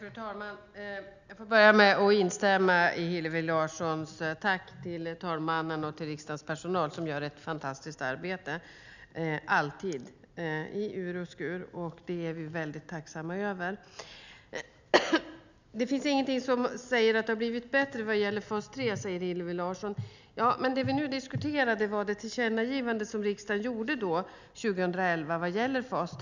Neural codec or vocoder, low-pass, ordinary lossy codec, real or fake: none; 7.2 kHz; none; real